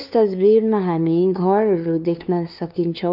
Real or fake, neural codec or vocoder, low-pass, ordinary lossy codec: fake; codec, 16 kHz, 2 kbps, FunCodec, trained on LibriTTS, 25 frames a second; 5.4 kHz; none